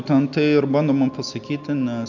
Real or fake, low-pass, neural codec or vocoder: real; 7.2 kHz; none